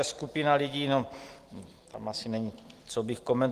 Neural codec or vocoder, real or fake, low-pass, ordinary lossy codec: none; real; 14.4 kHz; Opus, 24 kbps